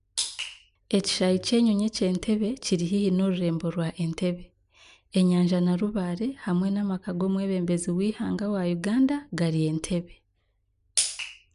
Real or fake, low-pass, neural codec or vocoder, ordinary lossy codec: real; 10.8 kHz; none; none